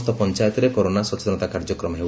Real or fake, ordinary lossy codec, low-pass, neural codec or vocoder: real; none; none; none